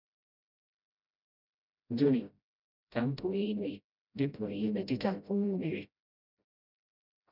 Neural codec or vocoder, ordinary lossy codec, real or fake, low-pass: codec, 16 kHz, 0.5 kbps, FreqCodec, smaller model; none; fake; 5.4 kHz